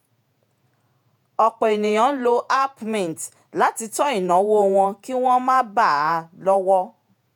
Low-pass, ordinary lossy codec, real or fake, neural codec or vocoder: 19.8 kHz; none; fake; vocoder, 48 kHz, 128 mel bands, Vocos